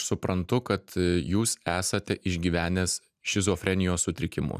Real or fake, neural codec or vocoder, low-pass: real; none; 14.4 kHz